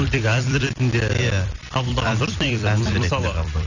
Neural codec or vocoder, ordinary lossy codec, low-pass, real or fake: vocoder, 44.1 kHz, 128 mel bands every 256 samples, BigVGAN v2; none; 7.2 kHz; fake